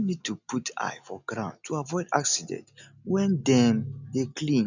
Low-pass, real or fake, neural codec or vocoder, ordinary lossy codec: 7.2 kHz; real; none; none